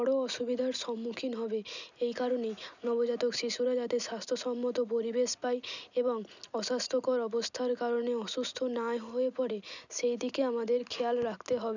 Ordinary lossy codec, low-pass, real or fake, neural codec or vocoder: none; 7.2 kHz; real; none